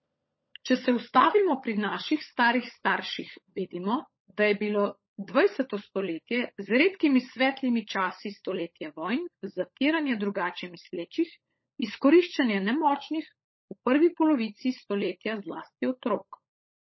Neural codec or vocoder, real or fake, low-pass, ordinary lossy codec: codec, 16 kHz, 16 kbps, FunCodec, trained on LibriTTS, 50 frames a second; fake; 7.2 kHz; MP3, 24 kbps